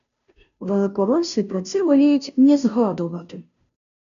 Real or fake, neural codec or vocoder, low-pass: fake; codec, 16 kHz, 0.5 kbps, FunCodec, trained on Chinese and English, 25 frames a second; 7.2 kHz